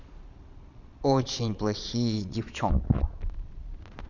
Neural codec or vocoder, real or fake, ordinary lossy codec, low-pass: vocoder, 22.05 kHz, 80 mel bands, Vocos; fake; none; 7.2 kHz